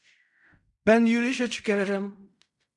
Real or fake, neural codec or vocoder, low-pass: fake; codec, 16 kHz in and 24 kHz out, 0.4 kbps, LongCat-Audio-Codec, fine tuned four codebook decoder; 10.8 kHz